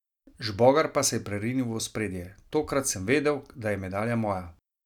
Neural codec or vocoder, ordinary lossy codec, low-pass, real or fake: none; none; 19.8 kHz; real